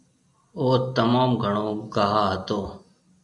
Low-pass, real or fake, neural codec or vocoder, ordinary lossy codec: 10.8 kHz; real; none; AAC, 64 kbps